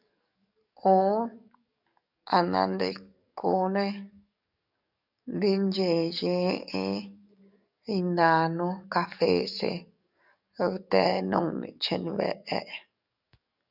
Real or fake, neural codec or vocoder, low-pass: fake; codec, 44.1 kHz, 7.8 kbps, DAC; 5.4 kHz